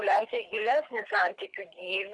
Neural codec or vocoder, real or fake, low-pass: codec, 24 kHz, 3 kbps, HILCodec; fake; 10.8 kHz